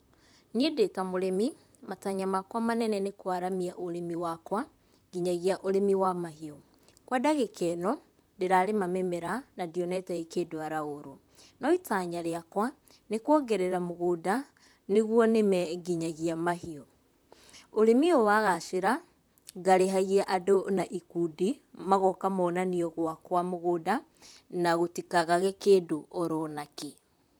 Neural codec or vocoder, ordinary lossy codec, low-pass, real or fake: vocoder, 44.1 kHz, 128 mel bands, Pupu-Vocoder; none; none; fake